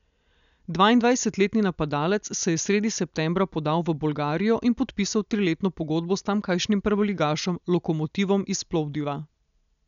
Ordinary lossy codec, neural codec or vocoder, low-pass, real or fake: none; none; 7.2 kHz; real